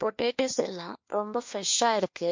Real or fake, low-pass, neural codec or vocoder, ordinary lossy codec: fake; 7.2 kHz; codec, 16 kHz, 1 kbps, FunCodec, trained on LibriTTS, 50 frames a second; MP3, 48 kbps